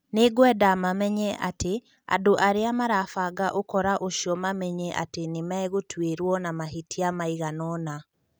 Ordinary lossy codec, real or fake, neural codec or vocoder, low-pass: none; real; none; none